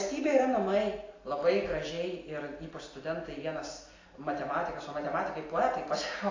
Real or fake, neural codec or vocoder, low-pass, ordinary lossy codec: real; none; 7.2 kHz; AAC, 32 kbps